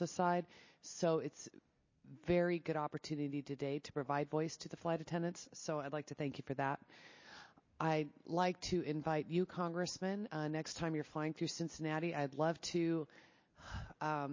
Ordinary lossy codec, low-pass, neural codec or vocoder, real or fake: MP3, 32 kbps; 7.2 kHz; none; real